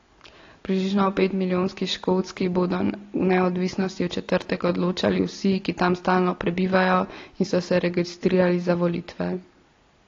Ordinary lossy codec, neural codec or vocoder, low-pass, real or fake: AAC, 32 kbps; none; 7.2 kHz; real